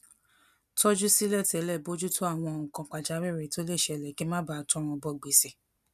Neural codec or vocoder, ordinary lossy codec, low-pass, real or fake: none; none; 14.4 kHz; real